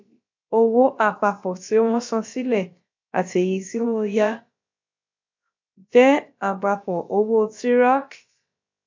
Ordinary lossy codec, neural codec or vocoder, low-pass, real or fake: MP3, 48 kbps; codec, 16 kHz, about 1 kbps, DyCAST, with the encoder's durations; 7.2 kHz; fake